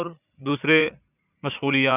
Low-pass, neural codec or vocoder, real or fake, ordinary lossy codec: 3.6 kHz; vocoder, 44.1 kHz, 80 mel bands, Vocos; fake; none